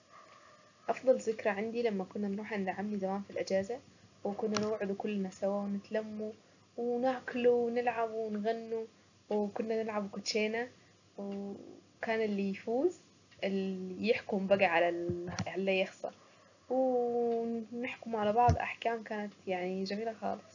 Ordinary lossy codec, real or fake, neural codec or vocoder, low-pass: none; real; none; 7.2 kHz